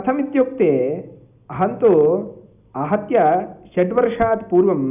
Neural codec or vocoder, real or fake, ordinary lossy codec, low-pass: none; real; none; 3.6 kHz